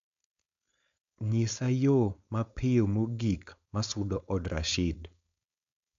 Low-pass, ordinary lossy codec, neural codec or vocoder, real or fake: 7.2 kHz; AAC, 64 kbps; codec, 16 kHz, 4.8 kbps, FACodec; fake